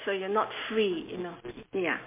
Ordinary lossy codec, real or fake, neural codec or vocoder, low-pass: MP3, 24 kbps; real; none; 3.6 kHz